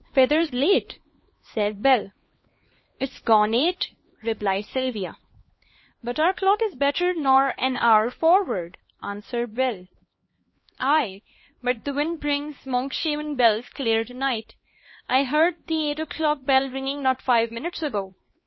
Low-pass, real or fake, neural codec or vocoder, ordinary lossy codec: 7.2 kHz; fake; codec, 16 kHz, 2 kbps, X-Codec, HuBERT features, trained on LibriSpeech; MP3, 24 kbps